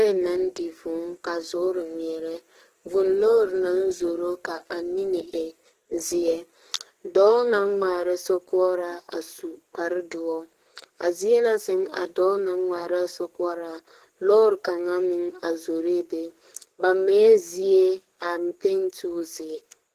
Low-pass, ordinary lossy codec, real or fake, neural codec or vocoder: 14.4 kHz; Opus, 16 kbps; fake; codec, 44.1 kHz, 2.6 kbps, SNAC